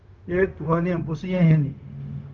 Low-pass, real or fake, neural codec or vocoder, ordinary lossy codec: 7.2 kHz; fake; codec, 16 kHz, 0.4 kbps, LongCat-Audio-Codec; Opus, 24 kbps